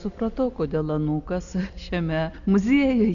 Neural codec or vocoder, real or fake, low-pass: none; real; 7.2 kHz